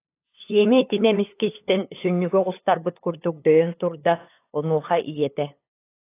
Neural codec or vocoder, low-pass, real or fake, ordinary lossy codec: codec, 16 kHz, 8 kbps, FunCodec, trained on LibriTTS, 25 frames a second; 3.6 kHz; fake; AAC, 24 kbps